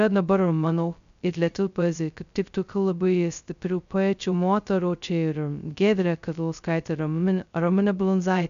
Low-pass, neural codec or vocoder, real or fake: 7.2 kHz; codec, 16 kHz, 0.2 kbps, FocalCodec; fake